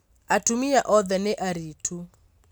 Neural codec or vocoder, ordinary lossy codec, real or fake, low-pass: none; none; real; none